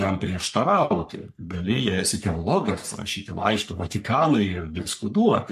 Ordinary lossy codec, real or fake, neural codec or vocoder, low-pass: MP3, 64 kbps; fake; codec, 44.1 kHz, 3.4 kbps, Pupu-Codec; 14.4 kHz